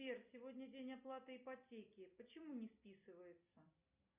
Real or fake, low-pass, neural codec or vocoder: real; 3.6 kHz; none